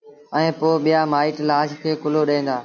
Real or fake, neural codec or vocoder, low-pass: real; none; 7.2 kHz